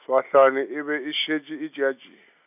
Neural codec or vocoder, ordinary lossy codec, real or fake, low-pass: none; none; real; 3.6 kHz